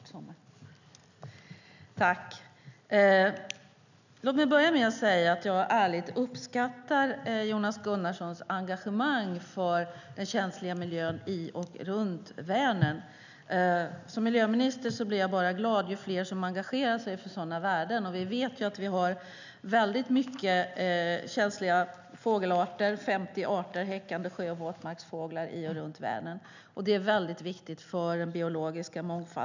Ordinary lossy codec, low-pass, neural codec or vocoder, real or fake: none; 7.2 kHz; none; real